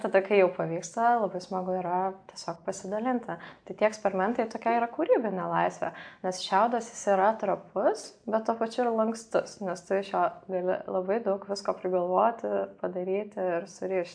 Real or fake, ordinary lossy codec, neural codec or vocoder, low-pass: real; AAC, 64 kbps; none; 9.9 kHz